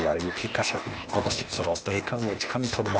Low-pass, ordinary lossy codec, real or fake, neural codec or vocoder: none; none; fake; codec, 16 kHz, 0.8 kbps, ZipCodec